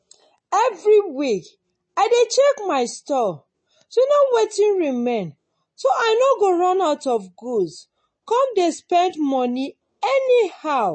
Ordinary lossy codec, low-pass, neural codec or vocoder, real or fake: MP3, 32 kbps; 9.9 kHz; none; real